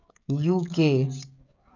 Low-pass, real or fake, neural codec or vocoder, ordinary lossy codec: 7.2 kHz; fake; codec, 16 kHz, 16 kbps, FreqCodec, smaller model; none